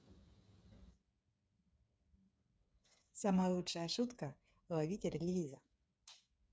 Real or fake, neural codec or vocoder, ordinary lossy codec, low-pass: fake; codec, 16 kHz, 8 kbps, FreqCodec, smaller model; none; none